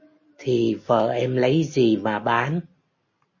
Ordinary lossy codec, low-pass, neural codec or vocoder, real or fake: MP3, 32 kbps; 7.2 kHz; none; real